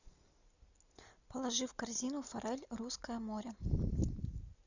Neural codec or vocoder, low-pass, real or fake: none; 7.2 kHz; real